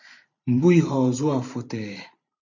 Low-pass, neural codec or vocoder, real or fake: 7.2 kHz; vocoder, 44.1 kHz, 128 mel bands every 512 samples, BigVGAN v2; fake